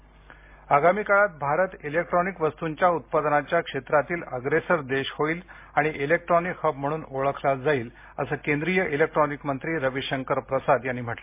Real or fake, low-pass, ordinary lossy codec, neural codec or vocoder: real; 3.6 kHz; MP3, 24 kbps; none